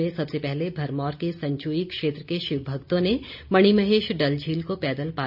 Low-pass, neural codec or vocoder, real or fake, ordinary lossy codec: 5.4 kHz; none; real; none